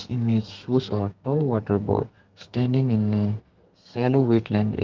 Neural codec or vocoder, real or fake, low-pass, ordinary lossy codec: codec, 32 kHz, 1.9 kbps, SNAC; fake; 7.2 kHz; Opus, 24 kbps